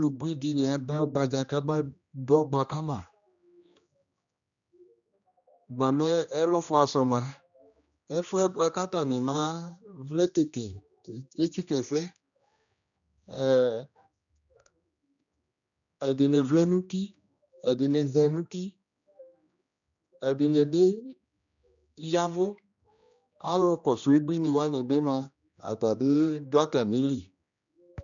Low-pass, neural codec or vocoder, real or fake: 7.2 kHz; codec, 16 kHz, 1 kbps, X-Codec, HuBERT features, trained on general audio; fake